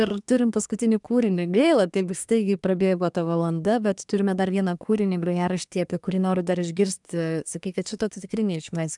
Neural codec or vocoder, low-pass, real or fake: codec, 24 kHz, 1 kbps, SNAC; 10.8 kHz; fake